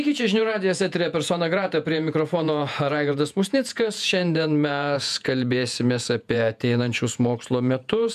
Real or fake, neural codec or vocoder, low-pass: fake; vocoder, 48 kHz, 128 mel bands, Vocos; 14.4 kHz